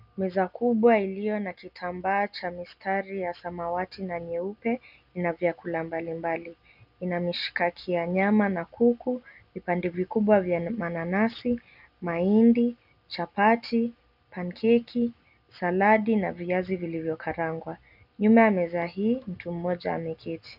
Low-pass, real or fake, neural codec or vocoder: 5.4 kHz; real; none